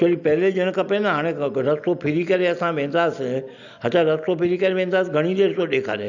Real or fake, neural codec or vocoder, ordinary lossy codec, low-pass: real; none; none; 7.2 kHz